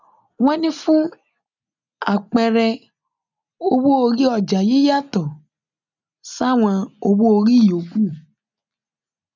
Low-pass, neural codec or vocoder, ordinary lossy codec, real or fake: 7.2 kHz; none; none; real